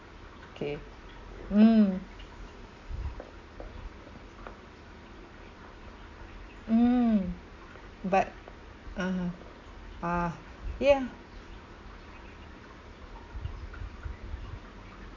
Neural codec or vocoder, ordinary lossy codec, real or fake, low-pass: none; MP3, 48 kbps; real; 7.2 kHz